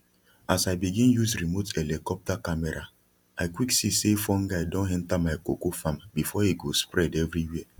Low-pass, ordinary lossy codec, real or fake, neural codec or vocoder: 19.8 kHz; none; real; none